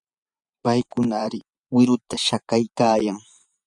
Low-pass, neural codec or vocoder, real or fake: 10.8 kHz; vocoder, 24 kHz, 100 mel bands, Vocos; fake